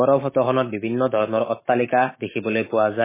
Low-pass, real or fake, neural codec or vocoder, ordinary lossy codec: 3.6 kHz; fake; autoencoder, 48 kHz, 128 numbers a frame, DAC-VAE, trained on Japanese speech; MP3, 16 kbps